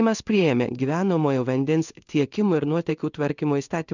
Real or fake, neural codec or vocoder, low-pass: fake; codec, 16 kHz in and 24 kHz out, 1 kbps, XY-Tokenizer; 7.2 kHz